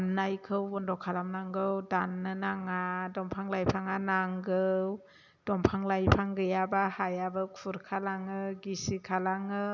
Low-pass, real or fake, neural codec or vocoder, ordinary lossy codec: 7.2 kHz; real; none; none